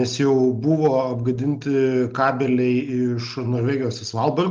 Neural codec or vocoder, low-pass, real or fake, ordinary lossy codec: none; 7.2 kHz; real; Opus, 24 kbps